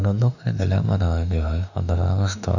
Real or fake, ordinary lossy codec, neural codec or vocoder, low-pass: fake; none; autoencoder, 48 kHz, 32 numbers a frame, DAC-VAE, trained on Japanese speech; 7.2 kHz